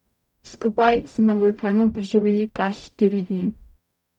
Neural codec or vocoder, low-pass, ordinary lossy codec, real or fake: codec, 44.1 kHz, 0.9 kbps, DAC; 19.8 kHz; none; fake